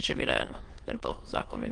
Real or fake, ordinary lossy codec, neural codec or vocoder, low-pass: fake; Opus, 24 kbps; autoencoder, 22.05 kHz, a latent of 192 numbers a frame, VITS, trained on many speakers; 9.9 kHz